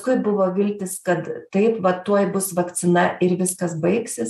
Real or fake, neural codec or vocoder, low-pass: real; none; 14.4 kHz